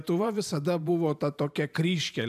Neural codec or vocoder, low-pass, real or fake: vocoder, 44.1 kHz, 128 mel bands every 512 samples, BigVGAN v2; 14.4 kHz; fake